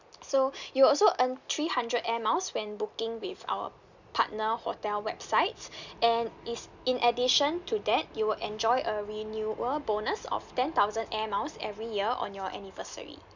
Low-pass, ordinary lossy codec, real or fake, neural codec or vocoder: 7.2 kHz; none; real; none